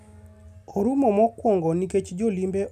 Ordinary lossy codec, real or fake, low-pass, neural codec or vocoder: none; real; 14.4 kHz; none